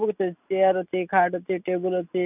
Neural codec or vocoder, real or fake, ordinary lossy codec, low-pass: none; real; none; 3.6 kHz